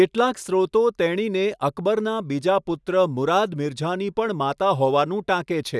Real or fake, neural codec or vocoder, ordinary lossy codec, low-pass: real; none; none; none